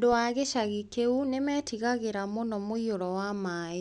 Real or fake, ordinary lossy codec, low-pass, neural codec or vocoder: real; none; 10.8 kHz; none